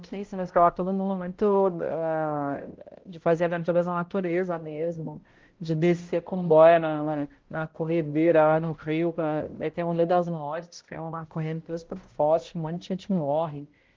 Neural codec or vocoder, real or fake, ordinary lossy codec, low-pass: codec, 16 kHz, 0.5 kbps, X-Codec, HuBERT features, trained on balanced general audio; fake; Opus, 16 kbps; 7.2 kHz